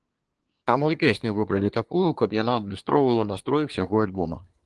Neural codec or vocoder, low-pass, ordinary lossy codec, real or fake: codec, 24 kHz, 1 kbps, SNAC; 10.8 kHz; Opus, 24 kbps; fake